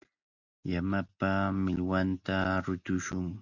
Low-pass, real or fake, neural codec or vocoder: 7.2 kHz; real; none